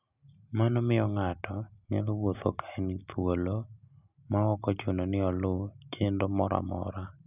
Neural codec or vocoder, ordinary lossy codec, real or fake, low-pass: none; none; real; 3.6 kHz